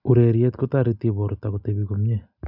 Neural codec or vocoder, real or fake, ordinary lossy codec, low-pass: none; real; none; 5.4 kHz